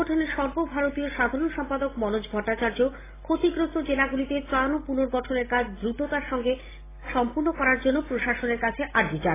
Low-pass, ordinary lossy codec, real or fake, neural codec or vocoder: 3.6 kHz; AAC, 16 kbps; real; none